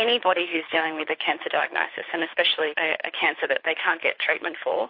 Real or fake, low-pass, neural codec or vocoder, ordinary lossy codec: fake; 5.4 kHz; codec, 24 kHz, 6 kbps, HILCodec; MP3, 32 kbps